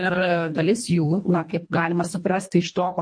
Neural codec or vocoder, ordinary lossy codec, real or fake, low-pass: codec, 24 kHz, 1.5 kbps, HILCodec; MP3, 48 kbps; fake; 9.9 kHz